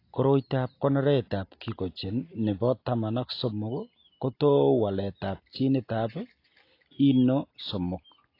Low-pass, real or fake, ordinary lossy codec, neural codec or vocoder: 5.4 kHz; real; AAC, 32 kbps; none